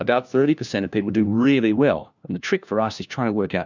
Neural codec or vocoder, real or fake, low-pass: codec, 16 kHz, 1 kbps, FunCodec, trained on LibriTTS, 50 frames a second; fake; 7.2 kHz